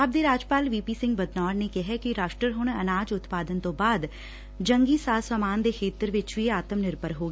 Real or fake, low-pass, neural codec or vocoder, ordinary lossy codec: real; none; none; none